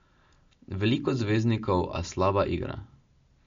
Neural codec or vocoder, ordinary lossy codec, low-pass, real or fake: none; MP3, 48 kbps; 7.2 kHz; real